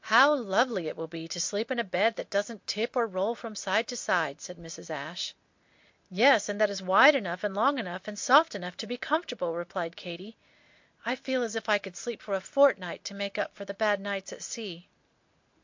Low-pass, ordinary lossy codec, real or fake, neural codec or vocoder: 7.2 kHz; MP3, 48 kbps; real; none